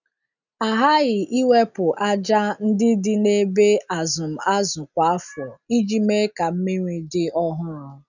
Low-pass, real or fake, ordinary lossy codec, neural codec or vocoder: 7.2 kHz; real; none; none